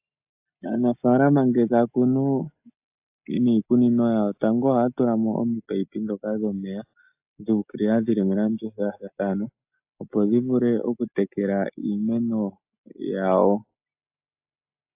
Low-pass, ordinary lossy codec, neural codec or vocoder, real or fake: 3.6 kHz; AAC, 32 kbps; none; real